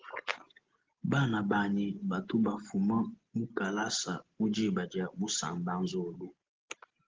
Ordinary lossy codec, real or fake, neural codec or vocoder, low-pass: Opus, 16 kbps; real; none; 7.2 kHz